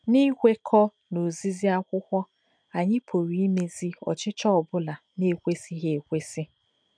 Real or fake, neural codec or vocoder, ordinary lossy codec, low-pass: real; none; none; 9.9 kHz